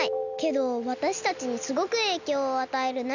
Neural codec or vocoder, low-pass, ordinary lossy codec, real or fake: none; 7.2 kHz; none; real